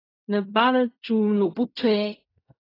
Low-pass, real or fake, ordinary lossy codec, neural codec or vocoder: 5.4 kHz; fake; AAC, 24 kbps; codec, 16 kHz in and 24 kHz out, 0.4 kbps, LongCat-Audio-Codec, fine tuned four codebook decoder